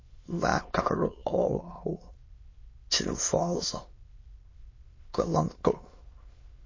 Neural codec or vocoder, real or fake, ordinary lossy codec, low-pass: autoencoder, 22.05 kHz, a latent of 192 numbers a frame, VITS, trained on many speakers; fake; MP3, 32 kbps; 7.2 kHz